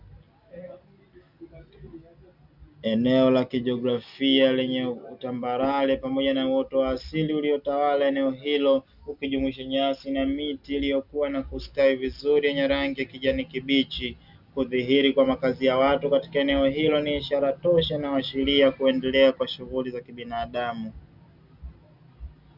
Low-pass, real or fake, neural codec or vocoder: 5.4 kHz; real; none